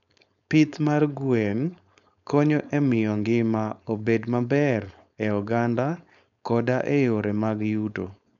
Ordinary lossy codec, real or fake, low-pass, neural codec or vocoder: none; fake; 7.2 kHz; codec, 16 kHz, 4.8 kbps, FACodec